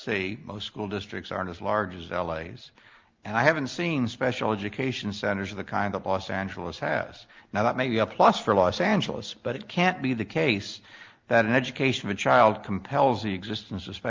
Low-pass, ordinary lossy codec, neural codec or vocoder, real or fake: 7.2 kHz; Opus, 32 kbps; none; real